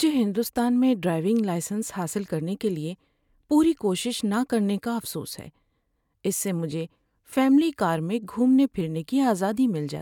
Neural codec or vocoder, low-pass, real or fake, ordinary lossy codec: none; 19.8 kHz; real; none